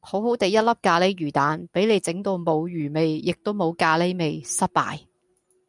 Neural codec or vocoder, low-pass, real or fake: none; 10.8 kHz; real